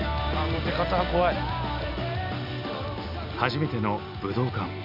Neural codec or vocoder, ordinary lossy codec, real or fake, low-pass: none; none; real; 5.4 kHz